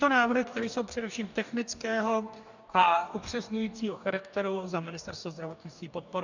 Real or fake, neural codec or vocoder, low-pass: fake; codec, 44.1 kHz, 2.6 kbps, DAC; 7.2 kHz